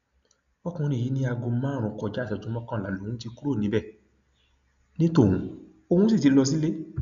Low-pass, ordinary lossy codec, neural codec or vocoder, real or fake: 7.2 kHz; none; none; real